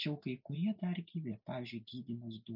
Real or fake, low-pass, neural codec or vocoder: real; 5.4 kHz; none